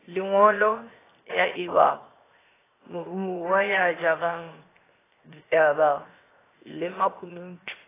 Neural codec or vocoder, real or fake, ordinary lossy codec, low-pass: codec, 16 kHz, 0.7 kbps, FocalCodec; fake; AAC, 16 kbps; 3.6 kHz